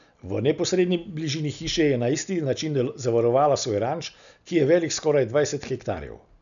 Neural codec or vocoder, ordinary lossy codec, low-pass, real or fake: none; none; 7.2 kHz; real